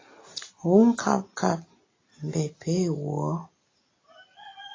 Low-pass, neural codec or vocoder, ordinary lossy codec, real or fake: 7.2 kHz; none; AAC, 32 kbps; real